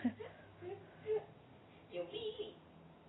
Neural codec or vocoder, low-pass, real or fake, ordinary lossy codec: none; 7.2 kHz; real; AAC, 16 kbps